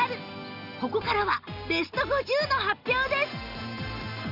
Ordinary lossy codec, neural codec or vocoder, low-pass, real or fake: none; none; 5.4 kHz; real